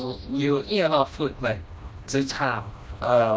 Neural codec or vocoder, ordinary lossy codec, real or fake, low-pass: codec, 16 kHz, 1 kbps, FreqCodec, smaller model; none; fake; none